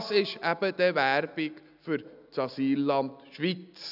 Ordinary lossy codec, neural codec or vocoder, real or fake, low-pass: none; none; real; 5.4 kHz